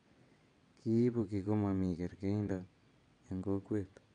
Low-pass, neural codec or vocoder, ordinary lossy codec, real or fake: 9.9 kHz; vocoder, 22.05 kHz, 80 mel bands, WaveNeXt; none; fake